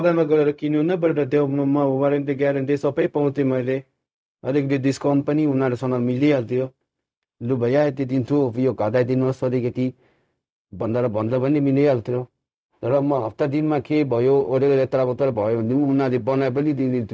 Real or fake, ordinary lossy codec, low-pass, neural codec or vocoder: fake; none; none; codec, 16 kHz, 0.4 kbps, LongCat-Audio-Codec